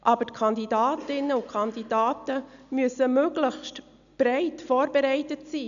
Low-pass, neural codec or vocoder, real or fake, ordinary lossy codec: 7.2 kHz; none; real; none